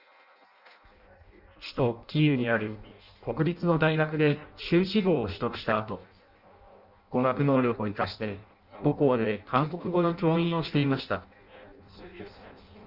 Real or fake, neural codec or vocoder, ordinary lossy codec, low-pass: fake; codec, 16 kHz in and 24 kHz out, 0.6 kbps, FireRedTTS-2 codec; Opus, 64 kbps; 5.4 kHz